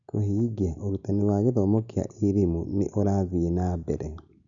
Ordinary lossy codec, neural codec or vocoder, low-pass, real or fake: none; none; 7.2 kHz; real